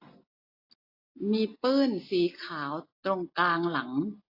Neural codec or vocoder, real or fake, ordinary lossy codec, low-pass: none; real; AAC, 32 kbps; 5.4 kHz